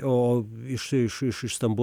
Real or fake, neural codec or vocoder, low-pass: real; none; 19.8 kHz